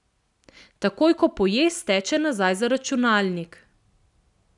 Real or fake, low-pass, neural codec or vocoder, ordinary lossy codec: real; 10.8 kHz; none; none